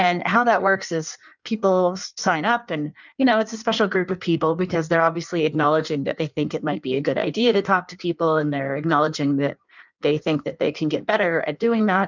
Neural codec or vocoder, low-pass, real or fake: codec, 16 kHz in and 24 kHz out, 1.1 kbps, FireRedTTS-2 codec; 7.2 kHz; fake